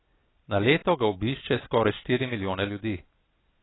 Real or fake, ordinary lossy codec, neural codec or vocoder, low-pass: real; AAC, 16 kbps; none; 7.2 kHz